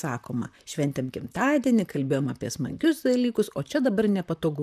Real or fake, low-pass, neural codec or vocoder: fake; 14.4 kHz; vocoder, 44.1 kHz, 128 mel bands, Pupu-Vocoder